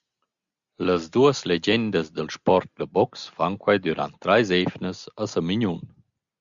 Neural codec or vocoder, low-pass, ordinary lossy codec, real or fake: none; 7.2 kHz; Opus, 64 kbps; real